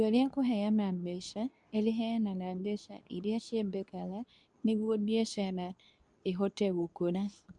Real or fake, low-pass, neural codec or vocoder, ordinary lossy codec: fake; 10.8 kHz; codec, 24 kHz, 0.9 kbps, WavTokenizer, medium speech release version 2; none